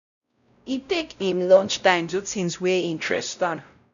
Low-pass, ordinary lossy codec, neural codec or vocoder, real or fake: 7.2 kHz; none; codec, 16 kHz, 0.5 kbps, X-Codec, WavLM features, trained on Multilingual LibriSpeech; fake